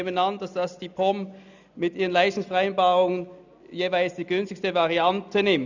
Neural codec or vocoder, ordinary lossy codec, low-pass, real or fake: none; none; 7.2 kHz; real